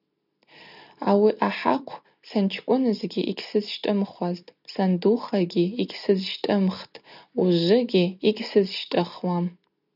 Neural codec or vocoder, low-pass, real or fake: none; 5.4 kHz; real